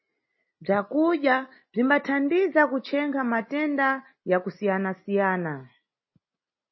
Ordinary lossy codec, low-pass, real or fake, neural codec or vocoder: MP3, 24 kbps; 7.2 kHz; real; none